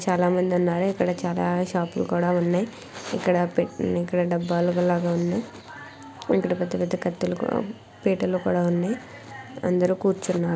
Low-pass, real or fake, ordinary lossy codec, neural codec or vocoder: none; real; none; none